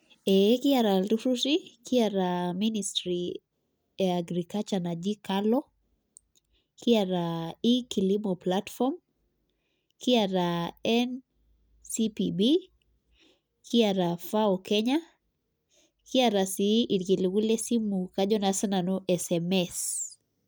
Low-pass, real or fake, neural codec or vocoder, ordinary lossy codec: none; real; none; none